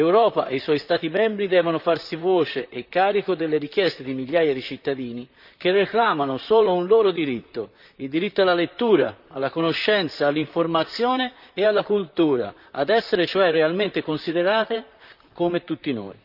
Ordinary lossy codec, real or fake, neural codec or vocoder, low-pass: none; fake; vocoder, 44.1 kHz, 128 mel bands, Pupu-Vocoder; 5.4 kHz